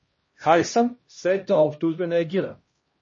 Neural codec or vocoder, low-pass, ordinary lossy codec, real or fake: codec, 16 kHz, 1 kbps, X-Codec, HuBERT features, trained on LibriSpeech; 7.2 kHz; MP3, 32 kbps; fake